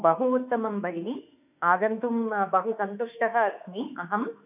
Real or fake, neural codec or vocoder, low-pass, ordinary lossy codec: fake; autoencoder, 48 kHz, 32 numbers a frame, DAC-VAE, trained on Japanese speech; 3.6 kHz; none